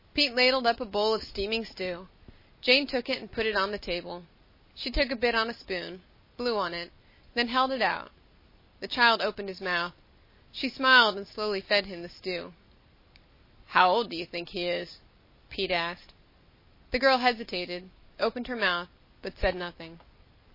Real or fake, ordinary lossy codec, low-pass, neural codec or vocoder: real; MP3, 24 kbps; 5.4 kHz; none